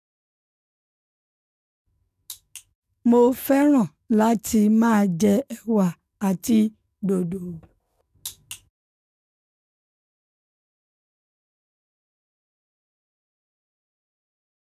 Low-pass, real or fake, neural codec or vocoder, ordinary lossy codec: 14.4 kHz; fake; codec, 44.1 kHz, 7.8 kbps, DAC; none